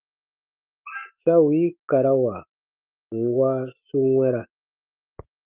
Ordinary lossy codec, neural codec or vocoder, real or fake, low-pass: Opus, 24 kbps; autoencoder, 48 kHz, 128 numbers a frame, DAC-VAE, trained on Japanese speech; fake; 3.6 kHz